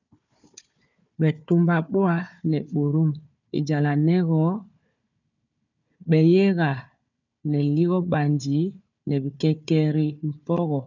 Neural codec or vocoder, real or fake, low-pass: codec, 16 kHz, 4 kbps, FunCodec, trained on Chinese and English, 50 frames a second; fake; 7.2 kHz